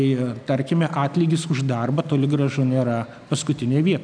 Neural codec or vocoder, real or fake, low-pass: none; real; 9.9 kHz